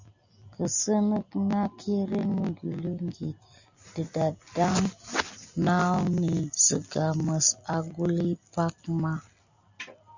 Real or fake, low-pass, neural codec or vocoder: real; 7.2 kHz; none